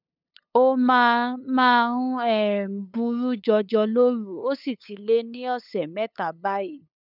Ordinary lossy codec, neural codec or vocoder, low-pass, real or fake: none; codec, 16 kHz, 8 kbps, FunCodec, trained on LibriTTS, 25 frames a second; 5.4 kHz; fake